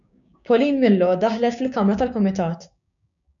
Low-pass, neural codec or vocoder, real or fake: 7.2 kHz; codec, 16 kHz, 6 kbps, DAC; fake